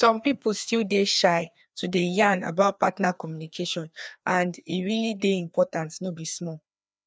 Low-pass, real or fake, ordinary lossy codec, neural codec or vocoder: none; fake; none; codec, 16 kHz, 2 kbps, FreqCodec, larger model